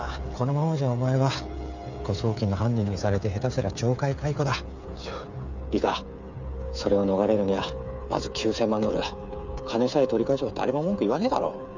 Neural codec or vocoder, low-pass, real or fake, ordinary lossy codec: codec, 16 kHz, 8 kbps, FreqCodec, smaller model; 7.2 kHz; fake; none